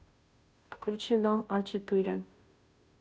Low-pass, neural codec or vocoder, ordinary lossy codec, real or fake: none; codec, 16 kHz, 0.5 kbps, FunCodec, trained on Chinese and English, 25 frames a second; none; fake